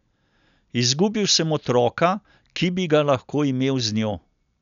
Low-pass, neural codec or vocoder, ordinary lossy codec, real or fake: 7.2 kHz; none; none; real